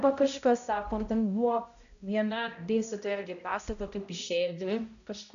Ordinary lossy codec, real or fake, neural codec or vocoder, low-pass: MP3, 96 kbps; fake; codec, 16 kHz, 0.5 kbps, X-Codec, HuBERT features, trained on balanced general audio; 7.2 kHz